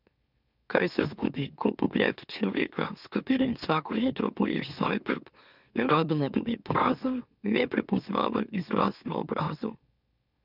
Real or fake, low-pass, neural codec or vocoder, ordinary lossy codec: fake; 5.4 kHz; autoencoder, 44.1 kHz, a latent of 192 numbers a frame, MeloTTS; none